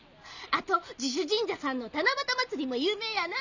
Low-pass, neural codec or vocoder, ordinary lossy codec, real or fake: 7.2 kHz; none; none; real